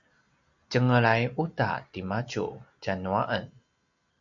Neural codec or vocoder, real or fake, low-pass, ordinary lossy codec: none; real; 7.2 kHz; MP3, 64 kbps